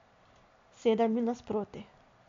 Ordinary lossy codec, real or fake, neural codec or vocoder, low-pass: MP3, 48 kbps; real; none; 7.2 kHz